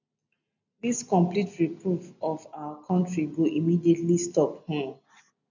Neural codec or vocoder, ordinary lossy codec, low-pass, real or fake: none; none; 7.2 kHz; real